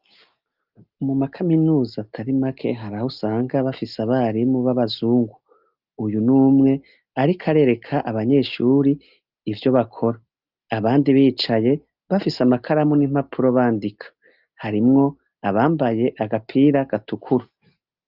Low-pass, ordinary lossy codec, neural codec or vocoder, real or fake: 5.4 kHz; Opus, 32 kbps; none; real